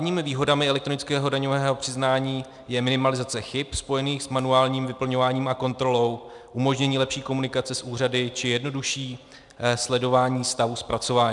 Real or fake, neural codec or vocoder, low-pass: real; none; 10.8 kHz